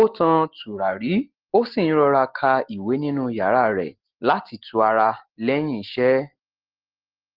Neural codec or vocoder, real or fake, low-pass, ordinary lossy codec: none; real; 5.4 kHz; Opus, 16 kbps